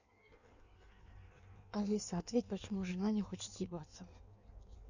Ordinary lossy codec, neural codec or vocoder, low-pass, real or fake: none; codec, 16 kHz in and 24 kHz out, 1.1 kbps, FireRedTTS-2 codec; 7.2 kHz; fake